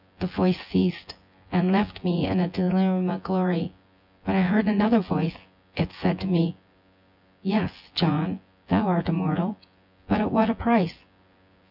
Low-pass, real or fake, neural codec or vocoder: 5.4 kHz; fake; vocoder, 24 kHz, 100 mel bands, Vocos